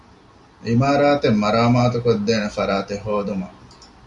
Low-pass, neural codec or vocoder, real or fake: 10.8 kHz; none; real